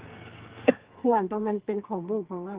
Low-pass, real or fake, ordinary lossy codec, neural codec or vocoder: 3.6 kHz; fake; Opus, 16 kbps; codec, 44.1 kHz, 2.6 kbps, SNAC